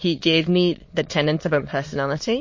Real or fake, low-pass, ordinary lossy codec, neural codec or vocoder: fake; 7.2 kHz; MP3, 32 kbps; autoencoder, 22.05 kHz, a latent of 192 numbers a frame, VITS, trained on many speakers